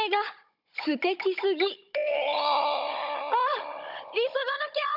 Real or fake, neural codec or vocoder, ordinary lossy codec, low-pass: fake; codec, 16 kHz, 16 kbps, FunCodec, trained on Chinese and English, 50 frames a second; none; 5.4 kHz